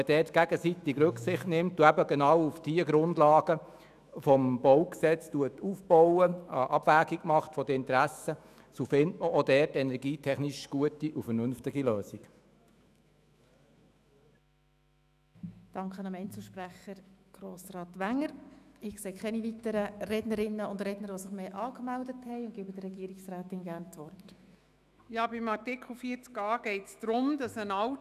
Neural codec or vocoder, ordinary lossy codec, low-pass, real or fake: autoencoder, 48 kHz, 128 numbers a frame, DAC-VAE, trained on Japanese speech; none; 14.4 kHz; fake